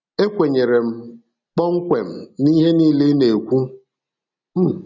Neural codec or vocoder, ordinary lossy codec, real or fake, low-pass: none; none; real; 7.2 kHz